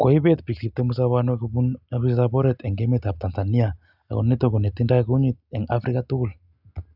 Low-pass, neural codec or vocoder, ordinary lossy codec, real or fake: 5.4 kHz; none; none; real